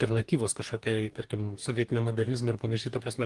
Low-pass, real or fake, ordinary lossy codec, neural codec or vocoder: 10.8 kHz; fake; Opus, 24 kbps; codec, 44.1 kHz, 2.6 kbps, DAC